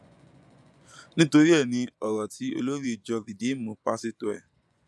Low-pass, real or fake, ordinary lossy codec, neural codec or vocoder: none; fake; none; vocoder, 24 kHz, 100 mel bands, Vocos